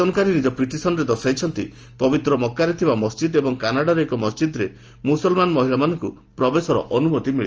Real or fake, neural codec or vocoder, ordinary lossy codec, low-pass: real; none; Opus, 24 kbps; 7.2 kHz